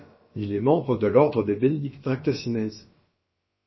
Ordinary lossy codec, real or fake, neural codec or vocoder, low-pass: MP3, 24 kbps; fake; codec, 16 kHz, about 1 kbps, DyCAST, with the encoder's durations; 7.2 kHz